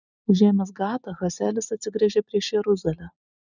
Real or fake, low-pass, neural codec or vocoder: real; 7.2 kHz; none